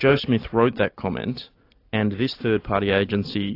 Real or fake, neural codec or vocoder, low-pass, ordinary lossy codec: real; none; 5.4 kHz; AAC, 32 kbps